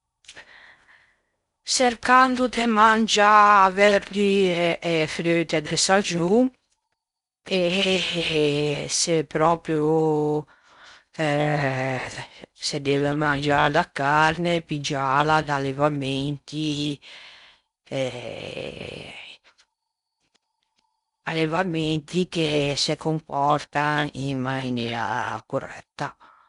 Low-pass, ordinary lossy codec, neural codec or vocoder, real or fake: 10.8 kHz; none; codec, 16 kHz in and 24 kHz out, 0.6 kbps, FocalCodec, streaming, 4096 codes; fake